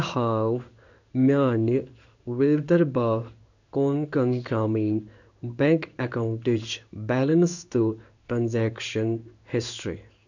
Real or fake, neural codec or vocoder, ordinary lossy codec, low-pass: fake; codec, 16 kHz in and 24 kHz out, 1 kbps, XY-Tokenizer; none; 7.2 kHz